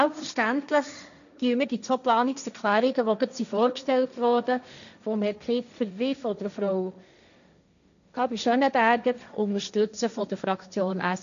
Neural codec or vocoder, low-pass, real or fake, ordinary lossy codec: codec, 16 kHz, 1.1 kbps, Voila-Tokenizer; 7.2 kHz; fake; none